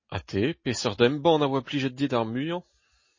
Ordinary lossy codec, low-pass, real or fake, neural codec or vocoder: MP3, 32 kbps; 7.2 kHz; real; none